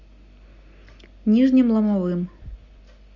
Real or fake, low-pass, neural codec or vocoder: real; 7.2 kHz; none